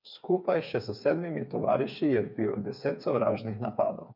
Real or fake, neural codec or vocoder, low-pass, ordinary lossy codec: fake; codec, 16 kHz, 4 kbps, FreqCodec, smaller model; 5.4 kHz; none